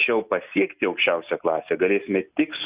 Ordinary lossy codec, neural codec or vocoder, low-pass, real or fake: Opus, 64 kbps; none; 3.6 kHz; real